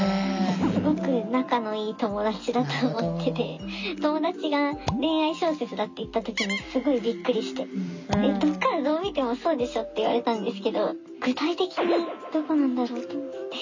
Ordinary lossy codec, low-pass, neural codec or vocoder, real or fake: MP3, 64 kbps; 7.2 kHz; none; real